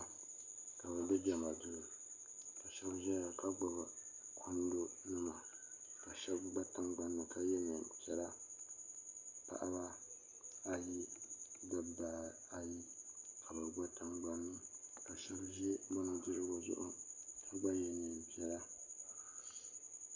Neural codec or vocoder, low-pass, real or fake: none; 7.2 kHz; real